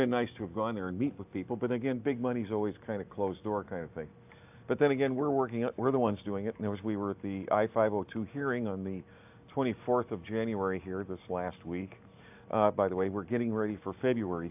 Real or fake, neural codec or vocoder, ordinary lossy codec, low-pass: real; none; AAC, 32 kbps; 3.6 kHz